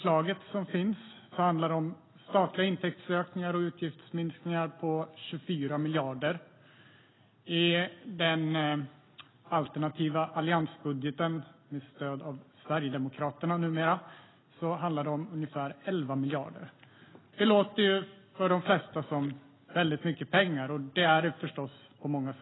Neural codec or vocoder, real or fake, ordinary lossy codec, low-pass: none; real; AAC, 16 kbps; 7.2 kHz